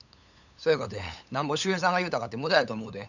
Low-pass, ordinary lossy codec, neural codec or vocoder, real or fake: 7.2 kHz; none; codec, 16 kHz, 8 kbps, FunCodec, trained on LibriTTS, 25 frames a second; fake